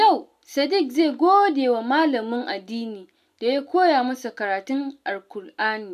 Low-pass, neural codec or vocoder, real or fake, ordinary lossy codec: 14.4 kHz; none; real; none